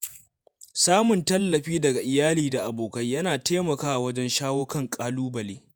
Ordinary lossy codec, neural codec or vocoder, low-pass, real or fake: none; vocoder, 48 kHz, 128 mel bands, Vocos; none; fake